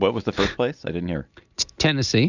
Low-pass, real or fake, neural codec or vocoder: 7.2 kHz; real; none